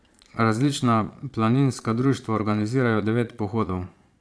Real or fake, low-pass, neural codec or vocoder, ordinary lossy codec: fake; none; vocoder, 22.05 kHz, 80 mel bands, Vocos; none